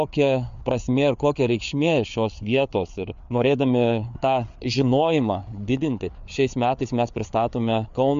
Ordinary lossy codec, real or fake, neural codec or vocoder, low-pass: MP3, 64 kbps; fake; codec, 16 kHz, 4 kbps, FreqCodec, larger model; 7.2 kHz